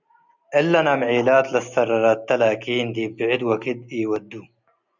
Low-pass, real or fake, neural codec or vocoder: 7.2 kHz; real; none